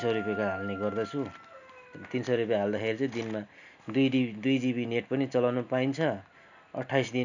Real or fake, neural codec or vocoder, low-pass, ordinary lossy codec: real; none; 7.2 kHz; none